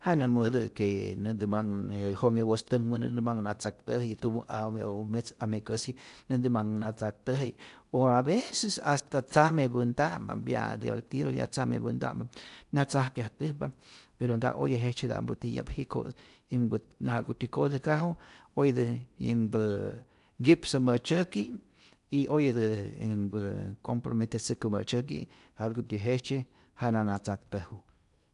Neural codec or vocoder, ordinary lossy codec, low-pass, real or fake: codec, 16 kHz in and 24 kHz out, 0.8 kbps, FocalCodec, streaming, 65536 codes; none; 10.8 kHz; fake